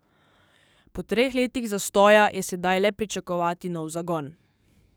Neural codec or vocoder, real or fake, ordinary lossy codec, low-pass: codec, 44.1 kHz, 7.8 kbps, DAC; fake; none; none